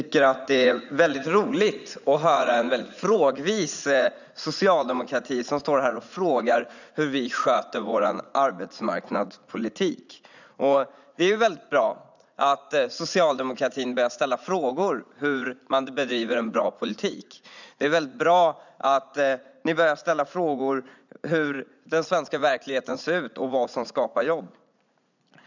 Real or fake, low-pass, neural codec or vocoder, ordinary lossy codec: fake; 7.2 kHz; vocoder, 44.1 kHz, 80 mel bands, Vocos; none